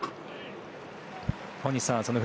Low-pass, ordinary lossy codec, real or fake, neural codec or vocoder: none; none; real; none